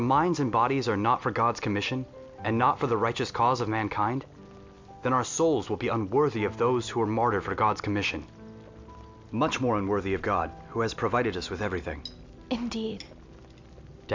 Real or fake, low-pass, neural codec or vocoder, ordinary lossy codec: real; 7.2 kHz; none; MP3, 64 kbps